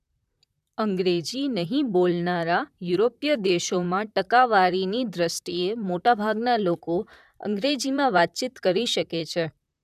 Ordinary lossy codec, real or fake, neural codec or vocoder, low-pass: none; fake; vocoder, 44.1 kHz, 128 mel bands, Pupu-Vocoder; 14.4 kHz